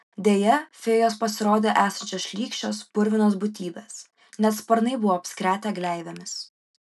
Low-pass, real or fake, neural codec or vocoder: 14.4 kHz; real; none